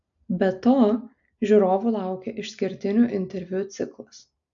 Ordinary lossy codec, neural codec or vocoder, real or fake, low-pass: AAC, 64 kbps; none; real; 7.2 kHz